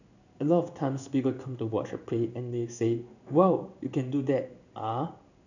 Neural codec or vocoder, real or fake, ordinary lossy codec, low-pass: codec, 16 kHz in and 24 kHz out, 1 kbps, XY-Tokenizer; fake; none; 7.2 kHz